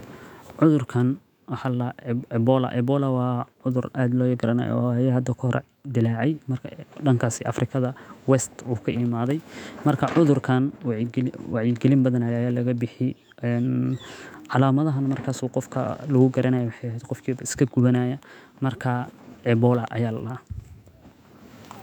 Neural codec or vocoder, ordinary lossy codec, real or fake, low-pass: autoencoder, 48 kHz, 128 numbers a frame, DAC-VAE, trained on Japanese speech; none; fake; 19.8 kHz